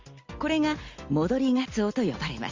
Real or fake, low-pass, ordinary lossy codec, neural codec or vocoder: real; 7.2 kHz; Opus, 32 kbps; none